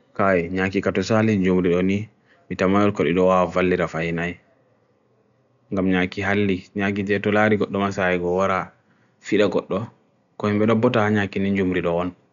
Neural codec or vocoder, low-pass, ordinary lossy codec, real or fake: none; 7.2 kHz; none; real